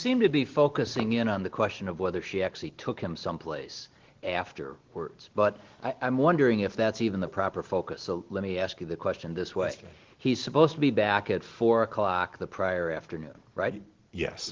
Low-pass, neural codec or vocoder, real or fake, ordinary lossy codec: 7.2 kHz; none; real; Opus, 16 kbps